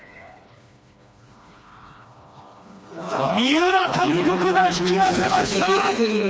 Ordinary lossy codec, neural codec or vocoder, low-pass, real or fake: none; codec, 16 kHz, 2 kbps, FreqCodec, smaller model; none; fake